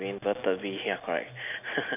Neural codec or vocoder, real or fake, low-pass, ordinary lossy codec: none; real; 3.6 kHz; none